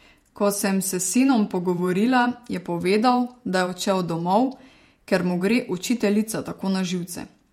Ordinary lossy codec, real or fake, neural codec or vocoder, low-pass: MP3, 64 kbps; fake; vocoder, 48 kHz, 128 mel bands, Vocos; 19.8 kHz